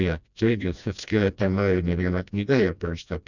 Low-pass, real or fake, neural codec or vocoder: 7.2 kHz; fake; codec, 16 kHz, 1 kbps, FreqCodec, smaller model